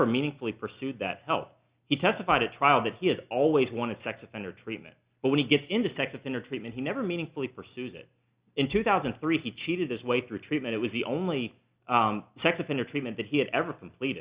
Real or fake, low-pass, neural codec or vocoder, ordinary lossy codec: real; 3.6 kHz; none; Opus, 64 kbps